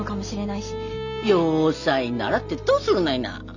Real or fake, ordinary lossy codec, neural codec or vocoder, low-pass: real; none; none; 7.2 kHz